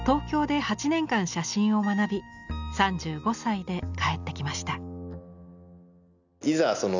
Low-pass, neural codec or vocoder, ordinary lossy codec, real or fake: 7.2 kHz; none; none; real